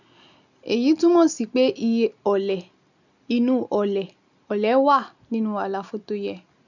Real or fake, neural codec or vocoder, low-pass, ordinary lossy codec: real; none; 7.2 kHz; none